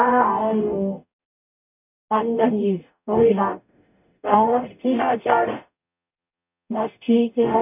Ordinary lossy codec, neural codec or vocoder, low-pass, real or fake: none; codec, 44.1 kHz, 0.9 kbps, DAC; 3.6 kHz; fake